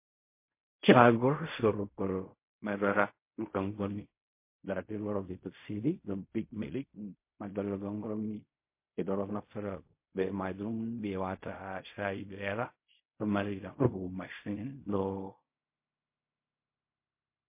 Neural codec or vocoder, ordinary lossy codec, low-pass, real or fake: codec, 16 kHz in and 24 kHz out, 0.4 kbps, LongCat-Audio-Codec, fine tuned four codebook decoder; MP3, 24 kbps; 3.6 kHz; fake